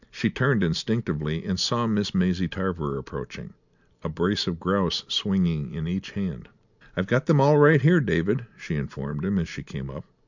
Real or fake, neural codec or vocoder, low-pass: real; none; 7.2 kHz